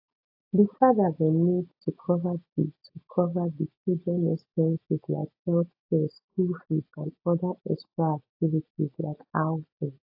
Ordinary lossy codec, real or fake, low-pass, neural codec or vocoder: AAC, 48 kbps; real; 5.4 kHz; none